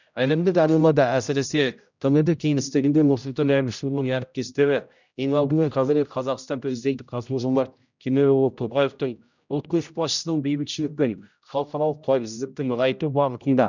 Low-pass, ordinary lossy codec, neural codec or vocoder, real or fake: 7.2 kHz; none; codec, 16 kHz, 0.5 kbps, X-Codec, HuBERT features, trained on general audio; fake